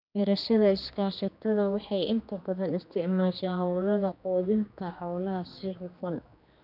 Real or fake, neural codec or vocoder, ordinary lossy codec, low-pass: fake; codec, 16 kHz, 2 kbps, X-Codec, HuBERT features, trained on general audio; none; 5.4 kHz